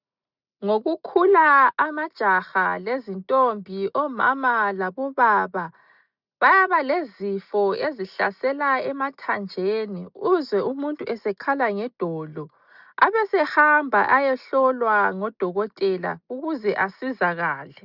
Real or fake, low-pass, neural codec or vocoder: real; 5.4 kHz; none